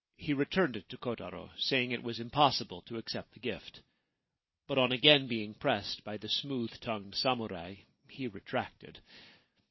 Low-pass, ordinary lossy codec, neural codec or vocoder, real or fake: 7.2 kHz; MP3, 24 kbps; none; real